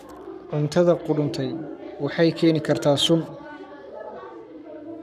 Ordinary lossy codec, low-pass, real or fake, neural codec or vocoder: none; 14.4 kHz; fake; codec, 44.1 kHz, 7.8 kbps, Pupu-Codec